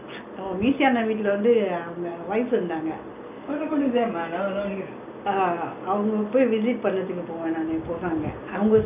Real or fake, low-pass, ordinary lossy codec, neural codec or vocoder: real; 3.6 kHz; none; none